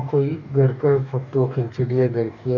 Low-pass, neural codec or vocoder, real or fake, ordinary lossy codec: 7.2 kHz; codec, 44.1 kHz, 2.6 kbps, DAC; fake; none